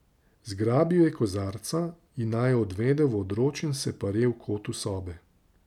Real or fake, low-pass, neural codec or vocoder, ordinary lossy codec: real; 19.8 kHz; none; none